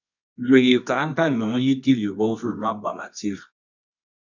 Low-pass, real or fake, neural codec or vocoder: 7.2 kHz; fake; codec, 24 kHz, 0.9 kbps, WavTokenizer, medium music audio release